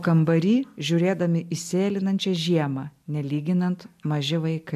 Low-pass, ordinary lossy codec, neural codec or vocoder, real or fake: 14.4 kHz; AAC, 96 kbps; none; real